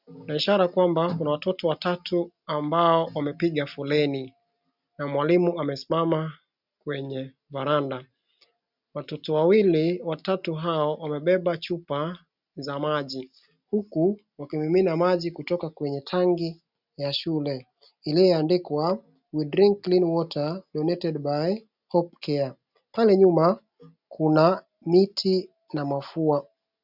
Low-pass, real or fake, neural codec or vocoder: 5.4 kHz; real; none